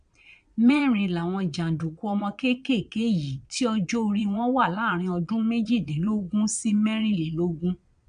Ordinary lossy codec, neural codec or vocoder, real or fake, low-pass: none; vocoder, 22.05 kHz, 80 mel bands, Vocos; fake; 9.9 kHz